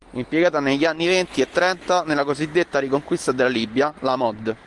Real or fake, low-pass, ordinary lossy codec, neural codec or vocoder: real; 10.8 kHz; Opus, 24 kbps; none